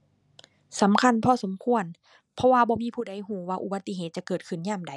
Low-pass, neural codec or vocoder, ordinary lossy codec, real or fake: none; none; none; real